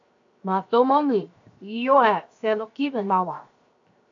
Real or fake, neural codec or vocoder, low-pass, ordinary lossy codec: fake; codec, 16 kHz, 0.7 kbps, FocalCodec; 7.2 kHz; AAC, 48 kbps